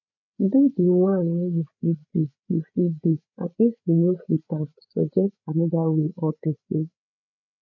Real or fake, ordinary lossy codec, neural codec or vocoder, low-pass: fake; MP3, 48 kbps; codec, 16 kHz, 8 kbps, FreqCodec, larger model; 7.2 kHz